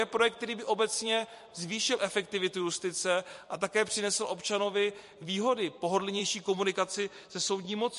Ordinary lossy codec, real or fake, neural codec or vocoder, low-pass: MP3, 48 kbps; fake; vocoder, 44.1 kHz, 128 mel bands every 256 samples, BigVGAN v2; 14.4 kHz